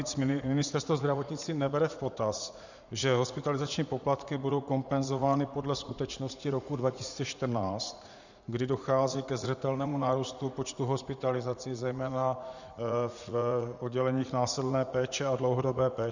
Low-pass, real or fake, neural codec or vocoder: 7.2 kHz; fake; vocoder, 44.1 kHz, 80 mel bands, Vocos